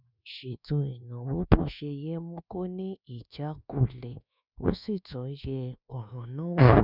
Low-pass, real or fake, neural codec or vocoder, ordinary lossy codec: 5.4 kHz; fake; codec, 24 kHz, 1.2 kbps, DualCodec; none